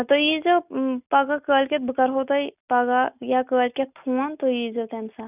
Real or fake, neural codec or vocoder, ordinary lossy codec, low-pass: real; none; none; 3.6 kHz